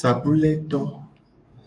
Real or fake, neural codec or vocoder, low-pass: fake; codec, 44.1 kHz, 7.8 kbps, DAC; 10.8 kHz